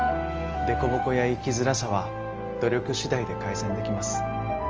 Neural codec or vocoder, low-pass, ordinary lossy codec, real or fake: none; 7.2 kHz; Opus, 24 kbps; real